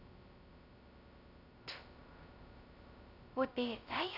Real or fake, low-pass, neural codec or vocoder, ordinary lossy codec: fake; 5.4 kHz; codec, 16 kHz, 0.2 kbps, FocalCodec; none